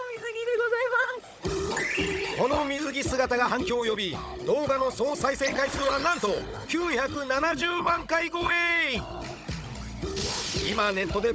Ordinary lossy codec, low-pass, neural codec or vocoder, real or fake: none; none; codec, 16 kHz, 16 kbps, FunCodec, trained on Chinese and English, 50 frames a second; fake